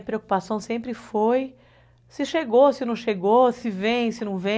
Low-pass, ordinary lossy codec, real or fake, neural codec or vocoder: none; none; real; none